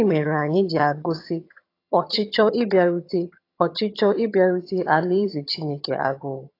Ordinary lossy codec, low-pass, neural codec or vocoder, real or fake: AAC, 32 kbps; 5.4 kHz; vocoder, 22.05 kHz, 80 mel bands, HiFi-GAN; fake